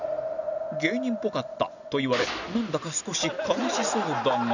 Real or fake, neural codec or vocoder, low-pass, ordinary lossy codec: real; none; 7.2 kHz; none